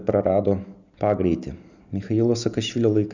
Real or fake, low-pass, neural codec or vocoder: real; 7.2 kHz; none